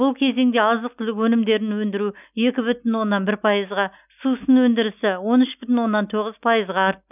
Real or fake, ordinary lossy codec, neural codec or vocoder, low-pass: real; none; none; 3.6 kHz